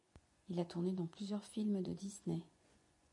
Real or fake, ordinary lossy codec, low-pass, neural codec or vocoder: fake; MP3, 64 kbps; 10.8 kHz; vocoder, 24 kHz, 100 mel bands, Vocos